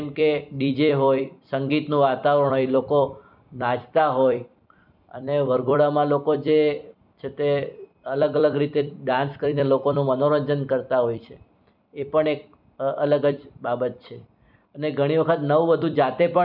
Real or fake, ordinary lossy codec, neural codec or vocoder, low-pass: fake; none; vocoder, 44.1 kHz, 128 mel bands every 256 samples, BigVGAN v2; 5.4 kHz